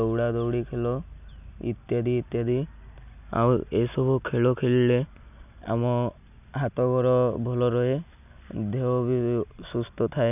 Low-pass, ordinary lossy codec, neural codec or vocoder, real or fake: 3.6 kHz; none; none; real